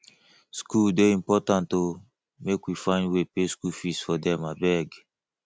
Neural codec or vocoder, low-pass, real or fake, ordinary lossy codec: none; none; real; none